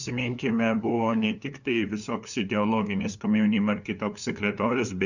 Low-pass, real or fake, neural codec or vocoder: 7.2 kHz; fake; codec, 16 kHz, 2 kbps, FunCodec, trained on LibriTTS, 25 frames a second